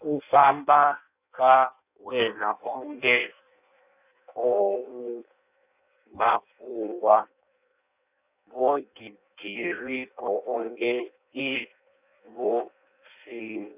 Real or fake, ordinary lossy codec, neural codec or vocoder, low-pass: fake; none; codec, 16 kHz in and 24 kHz out, 0.6 kbps, FireRedTTS-2 codec; 3.6 kHz